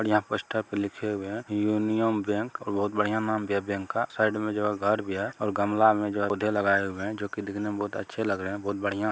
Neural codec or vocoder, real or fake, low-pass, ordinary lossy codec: none; real; none; none